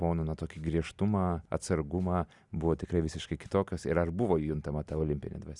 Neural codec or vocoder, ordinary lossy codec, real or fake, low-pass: none; Opus, 64 kbps; real; 10.8 kHz